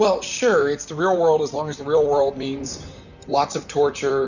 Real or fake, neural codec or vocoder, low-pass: fake; vocoder, 44.1 kHz, 128 mel bands, Pupu-Vocoder; 7.2 kHz